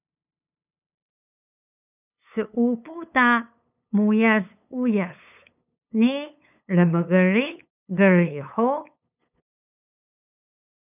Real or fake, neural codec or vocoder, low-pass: fake; codec, 16 kHz, 8 kbps, FunCodec, trained on LibriTTS, 25 frames a second; 3.6 kHz